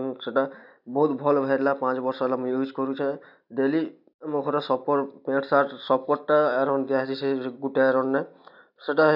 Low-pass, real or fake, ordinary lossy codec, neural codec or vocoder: 5.4 kHz; real; none; none